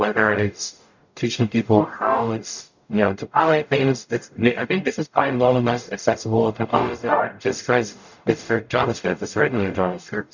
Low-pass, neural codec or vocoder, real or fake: 7.2 kHz; codec, 44.1 kHz, 0.9 kbps, DAC; fake